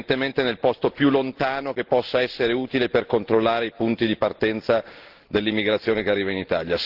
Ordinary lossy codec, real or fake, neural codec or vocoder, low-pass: Opus, 16 kbps; real; none; 5.4 kHz